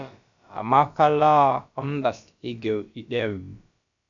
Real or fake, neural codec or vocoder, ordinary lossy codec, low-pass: fake; codec, 16 kHz, about 1 kbps, DyCAST, with the encoder's durations; MP3, 96 kbps; 7.2 kHz